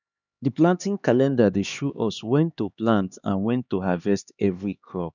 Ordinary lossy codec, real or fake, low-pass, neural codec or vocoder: none; fake; 7.2 kHz; codec, 16 kHz, 2 kbps, X-Codec, HuBERT features, trained on LibriSpeech